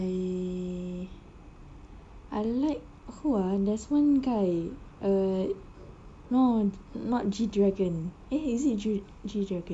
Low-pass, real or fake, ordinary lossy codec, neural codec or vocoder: 9.9 kHz; real; AAC, 64 kbps; none